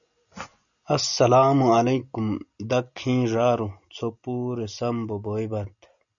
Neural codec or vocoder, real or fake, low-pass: none; real; 7.2 kHz